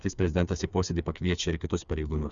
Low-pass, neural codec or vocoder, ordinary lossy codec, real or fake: 7.2 kHz; codec, 16 kHz, 4 kbps, FreqCodec, smaller model; Opus, 64 kbps; fake